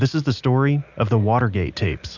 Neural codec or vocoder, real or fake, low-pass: none; real; 7.2 kHz